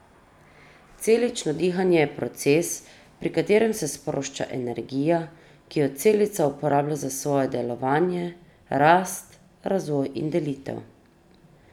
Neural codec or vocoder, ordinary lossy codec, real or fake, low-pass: none; none; real; 19.8 kHz